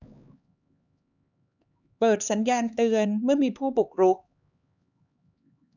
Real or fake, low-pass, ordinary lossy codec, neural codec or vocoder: fake; 7.2 kHz; none; codec, 16 kHz, 4 kbps, X-Codec, HuBERT features, trained on LibriSpeech